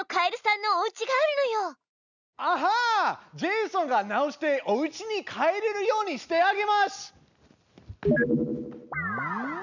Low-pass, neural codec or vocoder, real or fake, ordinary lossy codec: 7.2 kHz; none; real; none